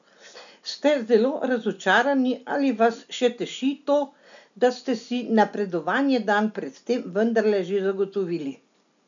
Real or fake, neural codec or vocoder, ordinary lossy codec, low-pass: real; none; none; 7.2 kHz